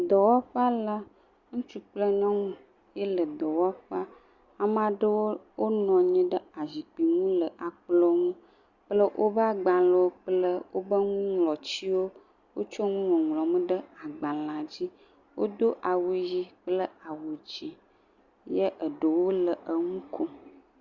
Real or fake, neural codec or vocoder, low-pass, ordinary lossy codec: real; none; 7.2 kHz; Opus, 64 kbps